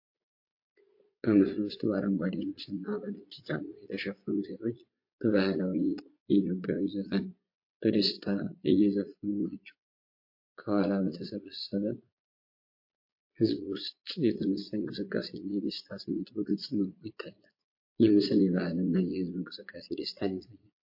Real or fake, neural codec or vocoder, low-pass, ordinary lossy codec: fake; vocoder, 22.05 kHz, 80 mel bands, Vocos; 5.4 kHz; MP3, 32 kbps